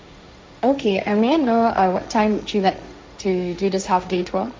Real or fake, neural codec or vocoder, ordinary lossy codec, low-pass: fake; codec, 16 kHz, 1.1 kbps, Voila-Tokenizer; none; none